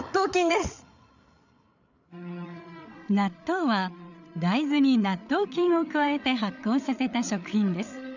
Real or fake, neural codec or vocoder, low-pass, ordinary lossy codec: fake; codec, 16 kHz, 8 kbps, FreqCodec, larger model; 7.2 kHz; none